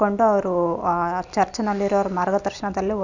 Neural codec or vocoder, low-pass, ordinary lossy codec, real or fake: none; 7.2 kHz; none; real